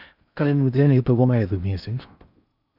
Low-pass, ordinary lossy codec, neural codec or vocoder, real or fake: 5.4 kHz; none; codec, 16 kHz in and 24 kHz out, 0.6 kbps, FocalCodec, streaming, 4096 codes; fake